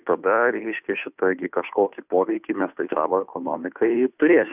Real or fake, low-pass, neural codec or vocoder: fake; 3.6 kHz; codec, 16 kHz, 2 kbps, FunCodec, trained on Chinese and English, 25 frames a second